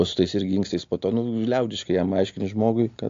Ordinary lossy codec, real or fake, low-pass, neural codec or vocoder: AAC, 48 kbps; real; 7.2 kHz; none